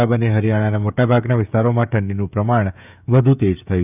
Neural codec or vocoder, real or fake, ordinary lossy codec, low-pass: codec, 16 kHz, 16 kbps, FreqCodec, smaller model; fake; none; 3.6 kHz